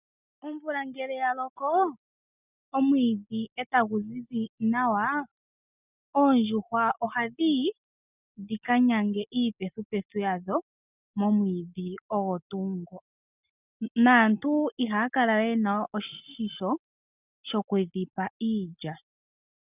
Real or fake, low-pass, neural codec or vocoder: real; 3.6 kHz; none